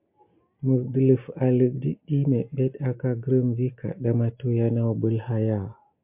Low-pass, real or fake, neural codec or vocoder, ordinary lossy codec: 3.6 kHz; real; none; MP3, 32 kbps